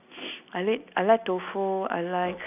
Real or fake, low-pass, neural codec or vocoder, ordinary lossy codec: real; 3.6 kHz; none; none